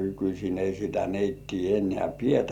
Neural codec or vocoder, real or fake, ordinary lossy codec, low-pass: none; real; none; 19.8 kHz